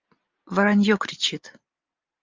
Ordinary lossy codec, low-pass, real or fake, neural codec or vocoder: Opus, 24 kbps; 7.2 kHz; real; none